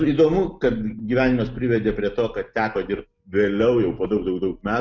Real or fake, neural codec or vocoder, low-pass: real; none; 7.2 kHz